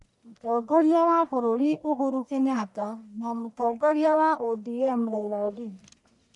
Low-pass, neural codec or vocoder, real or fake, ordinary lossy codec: 10.8 kHz; codec, 44.1 kHz, 1.7 kbps, Pupu-Codec; fake; none